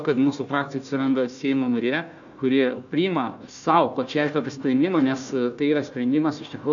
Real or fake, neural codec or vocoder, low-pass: fake; codec, 16 kHz, 1 kbps, FunCodec, trained on Chinese and English, 50 frames a second; 7.2 kHz